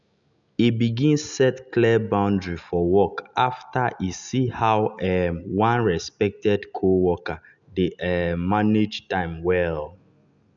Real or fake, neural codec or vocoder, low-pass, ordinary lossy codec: real; none; 7.2 kHz; none